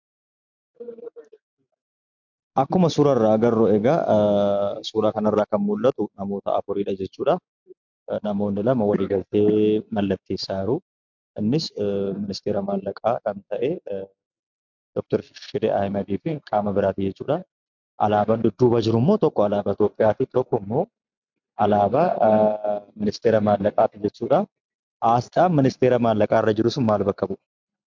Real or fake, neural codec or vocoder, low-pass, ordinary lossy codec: real; none; 7.2 kHz; MP3, 64 kbps